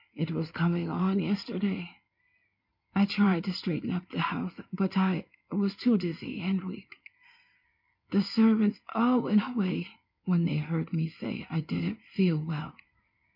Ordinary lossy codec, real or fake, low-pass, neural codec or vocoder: MP3, 48 kbps; fake; 5.4 kHz; codec, 16 kHz in and 24 kHz out, 2.2 kbps, FireRedTTS-2 codec